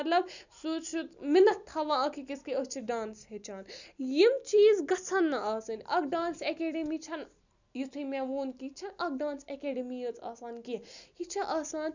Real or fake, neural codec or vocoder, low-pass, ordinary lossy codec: real; none; 7.2 kHz; none